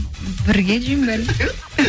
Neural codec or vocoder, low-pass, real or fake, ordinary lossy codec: none; none; real; none